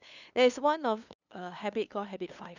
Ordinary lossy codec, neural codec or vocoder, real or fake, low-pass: none; codec, 16 kHz, 2 kbps, FunCodec, trained on LibriTTS, 25 frames a second; fake; 7.2 kHz